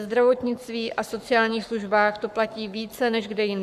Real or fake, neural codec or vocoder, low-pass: fake; codec, 44.1 kHz, 7.8 kbps, Pupu-Codec; 14.4 kHz